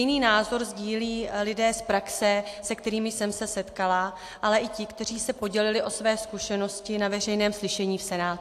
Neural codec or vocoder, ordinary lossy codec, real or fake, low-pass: none; AAC, 64 kbps; real; 14.4 kHz